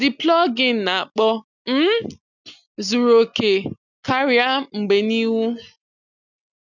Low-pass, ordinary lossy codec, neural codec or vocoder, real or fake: 7.2 kHz; none; none; real